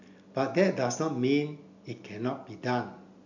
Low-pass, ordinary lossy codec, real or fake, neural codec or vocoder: 7.2 kHz; none; real; none